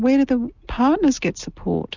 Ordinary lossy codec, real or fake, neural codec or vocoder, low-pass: Opus, 64 kbps; real; none; 7.2 kHz